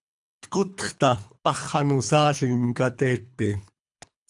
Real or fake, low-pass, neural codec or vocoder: fake; 10.8 kHz; codec, 24 kHz, 3 kbps, HILCodec